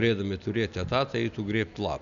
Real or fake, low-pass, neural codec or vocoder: real; 7.2 kHz; none